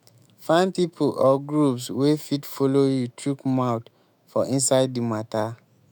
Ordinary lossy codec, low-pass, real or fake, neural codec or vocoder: none; none; fake; autoencoder, 48 kHz, 128 numbers a frame, DAC-VAE, trained on Japanese speech